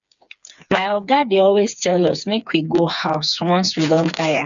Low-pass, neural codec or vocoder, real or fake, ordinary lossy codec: 7.2 kHz; codec, 16 kHz, 4 kbps, FreqCodec, smaller model; fake; none